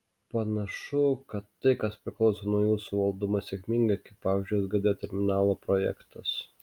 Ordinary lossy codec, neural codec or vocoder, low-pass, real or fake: Opus, 32 kbps; none; 19.8 kHz; real